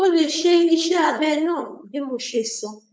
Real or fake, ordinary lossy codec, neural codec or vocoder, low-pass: fake; none; codec, 16 kHz, 4.8 kbps, FACodec; none